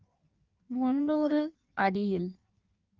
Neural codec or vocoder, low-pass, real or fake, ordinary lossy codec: codec, 16 kHz in and 24 kHz out, 1.1 kbps, FireRedTTS-2 codec; 7.2 kHz; fake; Opus, 24 kbps